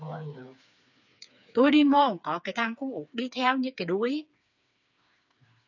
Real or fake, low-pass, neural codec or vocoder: fake; 7.2 kHz; codec, 16 kHz, 4 kbps, FreqCodec, smaller model